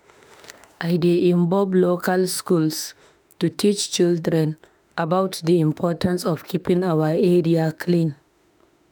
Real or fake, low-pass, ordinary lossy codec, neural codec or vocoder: fake; none; none; autoencoder, 48 kHz, 32 numbers a frame, DAC-VAE, trained on Japanese speech